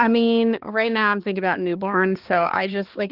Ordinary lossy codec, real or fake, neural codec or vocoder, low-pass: Opus, 16 kbps; fake; codec, 16 kHz, 2 kbps, X-Codec, HuBERT features, trained on balanced general audio; 5.4 kHz